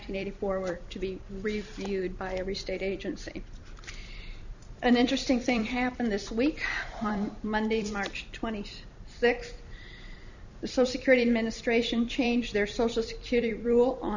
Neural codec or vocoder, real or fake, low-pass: vocoder, 44.1 kHz, 128 mel bands every 256 samples, BigVGAN v2; fake; 7.2 kHz